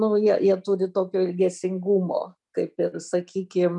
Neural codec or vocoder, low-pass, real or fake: none; 10.8 kHz; real